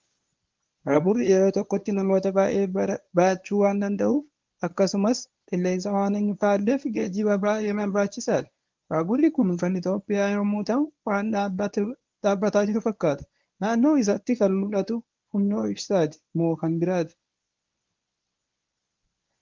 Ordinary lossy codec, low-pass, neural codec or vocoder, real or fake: Opus, 32 kbps; 7.2 kHz; codec, 24 kHz, 0.9 kbps, WavTokenizer, medium speech release version 1; fake